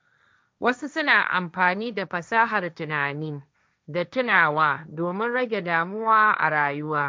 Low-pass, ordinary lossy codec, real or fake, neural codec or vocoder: none; none; fake; codec, 16 kHz, 1.1 kbps, Voila-Tokenizer